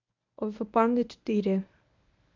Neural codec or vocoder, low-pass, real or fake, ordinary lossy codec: codec, 24 kHz, 0.9 kbps, WavTokenizer, medium speech release version 1; 7.2 kHz; fake; MP3, 64 kbps